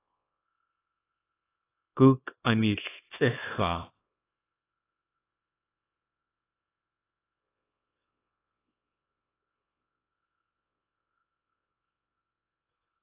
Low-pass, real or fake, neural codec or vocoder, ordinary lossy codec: 3.6 kHz; fake; codec, 24 kHz, 0.9 kbps, WavTokenizer, small release; AAC, 16 kbps